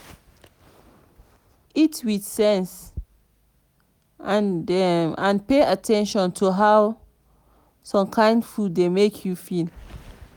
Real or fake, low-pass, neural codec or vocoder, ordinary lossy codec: real; none; none; none